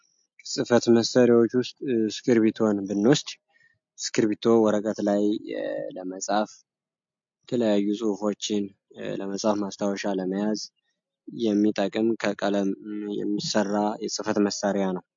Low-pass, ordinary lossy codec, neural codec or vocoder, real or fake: 7.2 kHz; MP3, 48 kbps; none; real